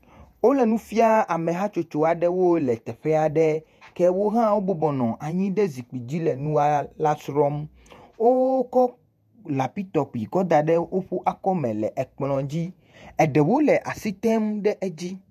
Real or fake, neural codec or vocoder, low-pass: fake; vocoder, 48 kHz, 128 mel bands, Vocos; 14.4 kHz